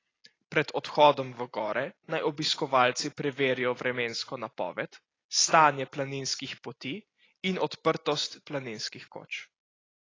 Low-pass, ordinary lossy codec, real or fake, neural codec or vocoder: 7.2 kHz; AAC, 32 kbps; real; none